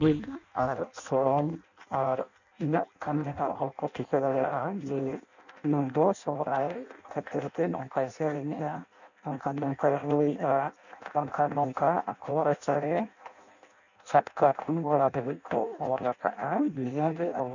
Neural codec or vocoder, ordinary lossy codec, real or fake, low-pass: codec, 16 kHz in and 24 kHz out, 0.6 kbps, FireRedTTS-2 codec; none; fake; 7.2 kHz